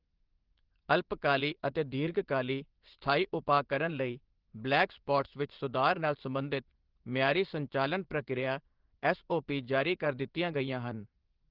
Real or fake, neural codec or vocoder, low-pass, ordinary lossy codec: fake; vocoder, 24 kHz, 100 mel bands, Vocos; 5.4 kHz; Opus, 16 kbps